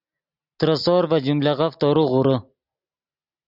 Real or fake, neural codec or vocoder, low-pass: real; none; 5.4 kHz